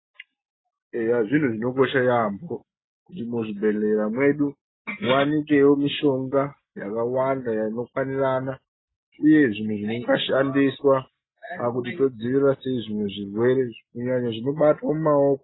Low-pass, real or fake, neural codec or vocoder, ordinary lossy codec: 7.2 kHz; real; none; AAC, 16 kbps